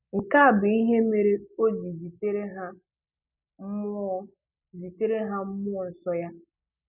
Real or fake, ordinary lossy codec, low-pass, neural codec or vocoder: real; none; 3.6 kHz; none